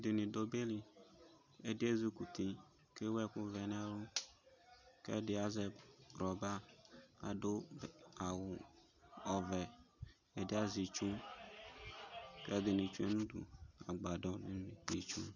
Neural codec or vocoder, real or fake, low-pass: none; real; 7.2 kHz